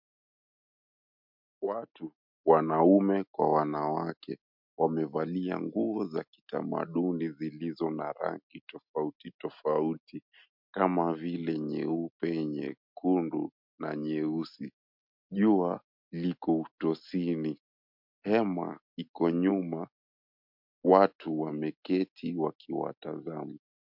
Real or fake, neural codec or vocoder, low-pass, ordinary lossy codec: real; none; 5.4 kHz; MP3, 48 kbps